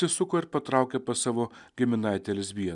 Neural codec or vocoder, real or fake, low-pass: none; real; 10.8 kHz